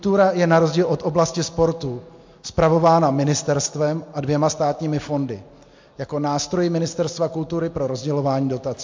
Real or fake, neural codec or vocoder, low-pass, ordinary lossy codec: real; none; 7.2 kHz; MP3, 48 kbps